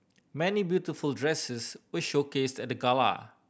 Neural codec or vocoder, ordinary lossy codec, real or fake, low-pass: none; none; real; none